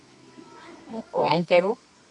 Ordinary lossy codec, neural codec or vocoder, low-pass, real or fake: AAC, 64 kbps; codec, 24 kHz, 0.9 kbps, WavTokenizer, medium music audio release; 10.8 kHz; fake